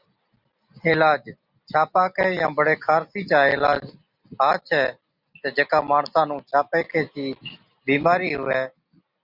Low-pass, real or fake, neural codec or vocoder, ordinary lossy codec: 5.4 kHz; real; none; Opus, 64 kbps